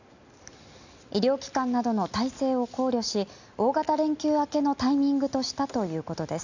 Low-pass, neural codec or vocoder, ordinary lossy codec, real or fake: 7.2 kHz; none; none; real